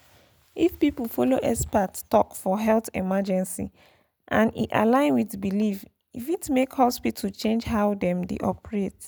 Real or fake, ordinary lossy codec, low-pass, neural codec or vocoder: real; none; none; none